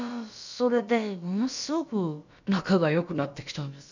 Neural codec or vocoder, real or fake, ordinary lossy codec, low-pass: codec, 16 kHz, about 1 kbps, DyCAST, with the encoder's durations; fake; none; 7.2 kHz